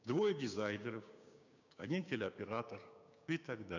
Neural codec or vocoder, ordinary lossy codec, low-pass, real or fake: codec, 16 kHz, 6 kbps, DAC; none; 7.2 kHz; fake